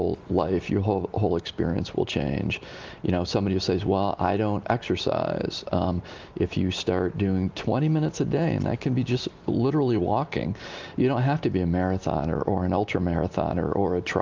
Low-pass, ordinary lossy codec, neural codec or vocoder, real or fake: 7.2 kHz; Opus, 32 kbps; none; real